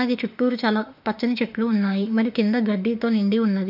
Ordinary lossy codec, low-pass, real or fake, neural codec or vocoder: none; 5.4 kHz; fake; autoencoder, 48 kHz, 32 numbers a frame, DAC-VAE, trained on Japanese speech